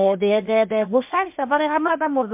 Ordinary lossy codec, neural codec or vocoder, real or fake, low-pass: MP3, 32 kbps; codec, 16 kHz, 0.8 kbps, ZipCodec; fake; 3.6 kHz